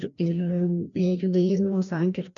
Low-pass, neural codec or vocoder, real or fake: 7.2 kHz; codec, 16 kHz, 1 kbps, FreqCodec, larger model; fake